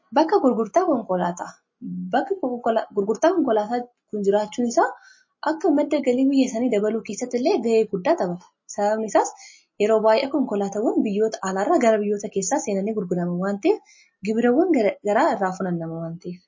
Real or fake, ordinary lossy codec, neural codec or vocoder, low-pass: real; MP3, 32 kbps; none; 7.2 kHz